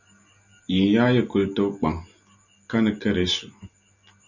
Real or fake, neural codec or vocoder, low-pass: real; none; 7.2 kHz